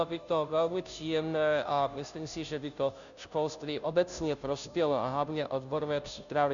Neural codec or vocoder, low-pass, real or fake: codec, 16 kHz, 0.5 kbps, FunCodec, trained on Chinese and English, 25 frames a second; 7.2 kHz; fake